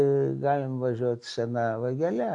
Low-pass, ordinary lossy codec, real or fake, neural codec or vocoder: 10.8 kHz; MP3, 96 kbps; real; none